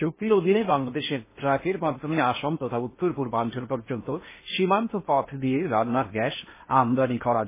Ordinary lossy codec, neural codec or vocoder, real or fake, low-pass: MP3, 16 kbps; codec, 16 kHz in and 24 kHz out, 0.8 kbps, FocalCodec, streaming, 65536 codes; fake; 3.6 kHz